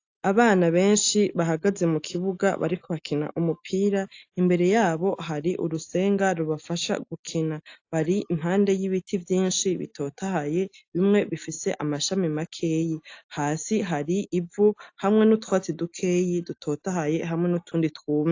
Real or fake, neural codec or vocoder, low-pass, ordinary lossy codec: real; none; 7.2 kHz; AAC, 48 kbps